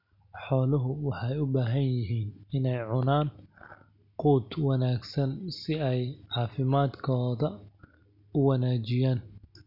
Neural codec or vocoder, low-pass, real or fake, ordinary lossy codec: none; 5.4 kHz; real; none